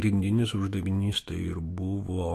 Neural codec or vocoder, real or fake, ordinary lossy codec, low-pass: autoencoder, 48 kHz, 128 numbers a frame, DAC-VAE, trained on Japanese speech; fake; AAC, 48 kbps; 14.4 kHz